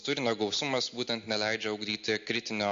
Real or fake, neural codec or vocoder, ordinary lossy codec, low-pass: real; none; MP3, 48 kbps; 7.2 kHz